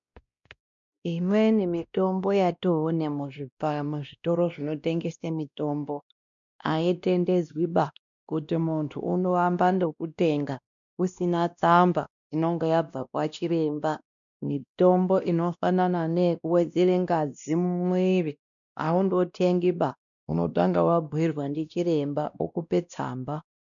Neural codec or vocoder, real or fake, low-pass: codec, 16 kHz, 1 kbps, X-Codec, WavLM features, trained on Multilingual LibriSpeech; fake; 7.2 kHz